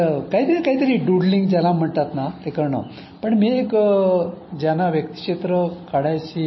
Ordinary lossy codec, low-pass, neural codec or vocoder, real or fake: MP3, 24 kbps; 7.2 kHz; none; real